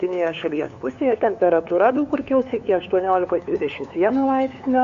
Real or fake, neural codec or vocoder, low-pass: fake; codec, 16 kHz, 2 kbps, FunCodec, trained on LibriTTS, 25 frames a second; 7.2 kHz